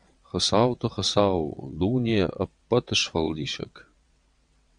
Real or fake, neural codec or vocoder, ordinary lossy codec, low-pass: fake; vocoder, 22.05 kHz, 80 mel bands, WaveNeXt; Opus, 64 kbps; 9.9 kHz